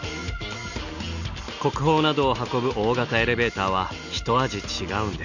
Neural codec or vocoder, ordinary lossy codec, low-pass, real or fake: none; none; 7.2 kHz; real